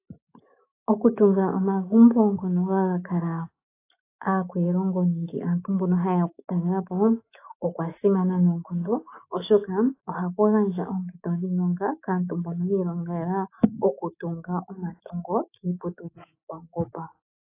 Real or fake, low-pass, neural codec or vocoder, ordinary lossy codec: fake; 3.6 kHz; autoencoder, 48 kHz, 128 numbers a frame, DAC-VAE, trained on Japanese speech; AAC, 24 kbps